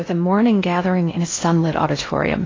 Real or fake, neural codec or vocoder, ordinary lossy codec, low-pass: fake; codec, 16 kHz in and 24 kHz out, 0.6 kbps, FocalCodec, streaming, 2048 codes; AAC, 32 kbps; 7.2 kHz